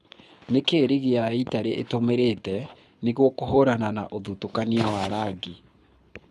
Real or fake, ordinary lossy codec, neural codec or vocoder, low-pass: fake; none; codec, 24 kHz, 6 kbps, HILCodec; none